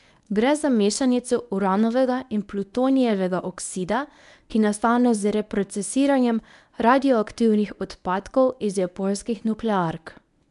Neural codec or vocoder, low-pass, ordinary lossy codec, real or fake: codec, 24 kHz, 0.9 kbps, WavTokenizer, medium speech release version 1; 10.8 kHz; none; fake